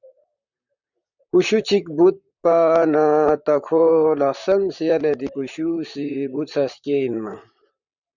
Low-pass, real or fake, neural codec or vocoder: 7.2 kHz; fake; vocoder, 44.1 kHz, 128 mel bands, Pupu-Vocoder